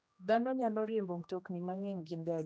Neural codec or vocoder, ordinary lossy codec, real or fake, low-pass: codec, 16 kHz, 1 kbps, X-Codec, HuBERT features, trained on general audio; none; fake; none